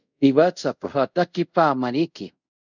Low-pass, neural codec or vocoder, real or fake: 7.2 kHz; codec, 24 kHz, 0.5 kbps, DualCodec; fake